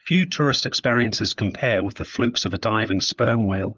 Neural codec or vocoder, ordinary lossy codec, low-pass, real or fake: codec, 16 kHz, 4 kbps, FunCodec, trained on LibriTTS, 50 frames a second; Opus, 24 kbps; 7.2 kHz; fake